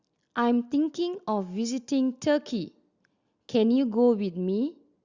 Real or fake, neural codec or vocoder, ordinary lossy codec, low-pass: real; none; Opus, 64 kbps; 7.2 kHz